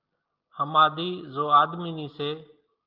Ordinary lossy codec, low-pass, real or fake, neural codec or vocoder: Opus, 24 kbps; 5.4 kHz; real; none